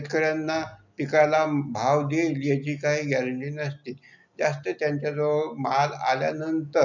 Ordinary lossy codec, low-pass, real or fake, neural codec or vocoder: none; 7.2 kHz; real; none